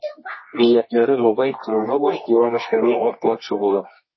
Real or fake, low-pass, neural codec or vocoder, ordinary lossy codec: fake; 7.2 kHz; codec, 24 kHz, 0.9 kbps, WavTokenizer, medium music audio release; MP3, 24 kbps